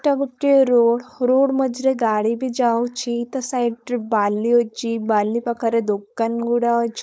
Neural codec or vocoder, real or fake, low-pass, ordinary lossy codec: codec, 16 kHz, 4.8 kbps, FACodec; fake; none; none